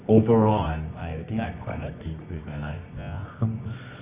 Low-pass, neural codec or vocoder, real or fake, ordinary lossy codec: 3.6 kHz; codec, 24 kHz, 0.9 kbps, WavTokenizer, medium music audio release; fake; Opus, 64 kbps